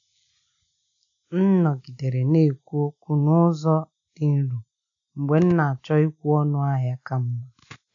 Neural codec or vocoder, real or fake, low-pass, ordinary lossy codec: none; real; 7.2 kHz; none